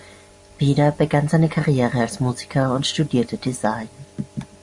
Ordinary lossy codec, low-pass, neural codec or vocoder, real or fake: Opus, 64 kbps; 10.8 kHz; none; real